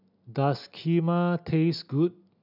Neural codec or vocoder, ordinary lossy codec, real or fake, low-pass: none; none; real; 5.4 kHz